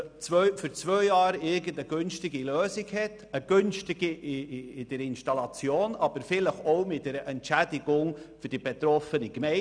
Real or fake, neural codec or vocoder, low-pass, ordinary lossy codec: real; none; 9.9 kHz; none